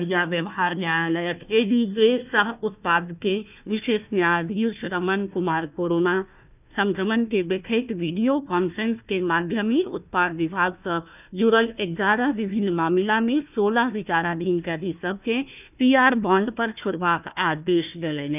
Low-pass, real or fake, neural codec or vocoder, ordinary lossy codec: 3.6 kHz; fake; codec, 16 kHz, 1 kbps, FunCodec, trained on Chinese and English, 50 frames a second; none